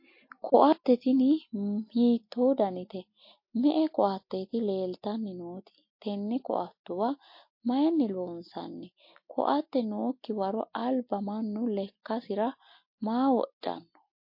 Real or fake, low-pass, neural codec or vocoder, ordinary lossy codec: real; 5.4 kHz; none; MP3, 32 kbps